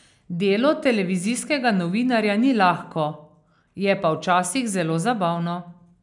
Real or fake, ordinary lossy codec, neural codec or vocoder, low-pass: real; none; none; 10.8 kHz